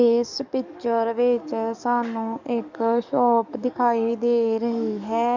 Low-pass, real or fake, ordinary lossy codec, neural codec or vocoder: 7.2 kHz; fake; none; codec, 44.1 kHz, 7.8 kbps, DAC